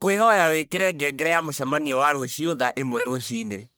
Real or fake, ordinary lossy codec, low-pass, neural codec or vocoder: fake; none; none; codec, 44.1 kHz, 1.7 kbps, Pupu-Codec